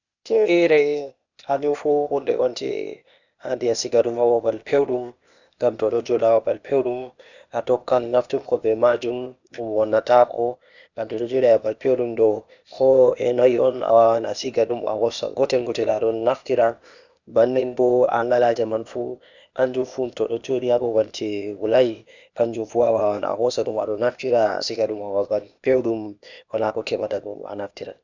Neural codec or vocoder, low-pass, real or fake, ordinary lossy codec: codec, 16 kHz, 0.8 kbps, ZipCodec; 7.2 kHz; fake; none